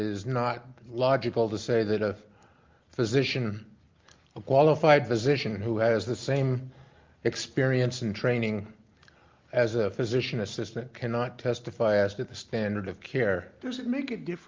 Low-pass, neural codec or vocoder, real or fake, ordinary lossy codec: 7.2 kHz; none; real; Opus, 24 kbps